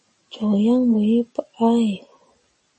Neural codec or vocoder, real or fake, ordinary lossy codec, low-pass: none; real; MP3, 32 kbps; 10.8 kHz